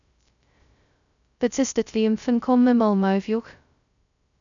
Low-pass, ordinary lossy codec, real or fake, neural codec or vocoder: 7.2 kHz; none; fake; codec, 16 kHz, 0.2 kbps, FocalCodec